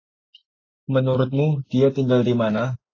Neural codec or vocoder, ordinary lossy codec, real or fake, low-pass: none; AAC, 32 kbps; real; 7.2 kHz